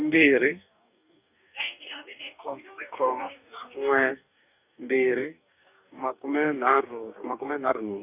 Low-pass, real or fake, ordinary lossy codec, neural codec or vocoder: 3.6 kHz; fake; none; codec, 44.1 kHz, 2.6 kbps, DAC